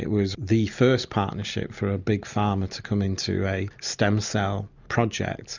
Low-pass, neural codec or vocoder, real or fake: 7.2 kHz; none; real